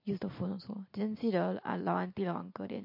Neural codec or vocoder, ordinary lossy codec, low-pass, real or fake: none; MP3, 32 kbps; 5.4 kHz; real